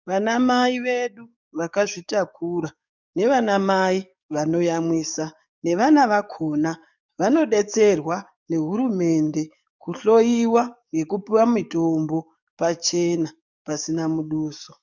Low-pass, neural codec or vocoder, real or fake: 7.2 kHz; codec, 44.1 kHz, 7.8 kbps, DAC; fake